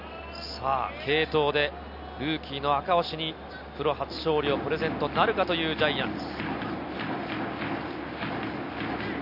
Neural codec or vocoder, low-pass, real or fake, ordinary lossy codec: none; 5.4 kHz; real; none